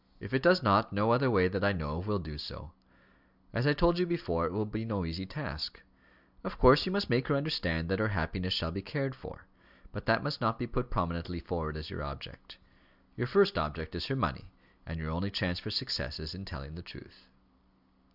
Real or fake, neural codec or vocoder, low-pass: real; none; 5.4 kHz